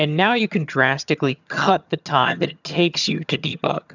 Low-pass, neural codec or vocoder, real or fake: 7.2 kHz; vocoder, 22.05 kHz, 80 mel bands, HiFi-GAN; fake